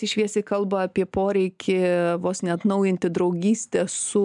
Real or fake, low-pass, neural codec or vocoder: real; 10.8 kHz; none